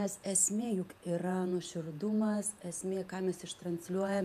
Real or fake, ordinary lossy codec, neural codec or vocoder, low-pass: fake; MP3, 96 kbps; vocoder, 48 kHz, 128 mel bands, Vocos; 14.4 kHz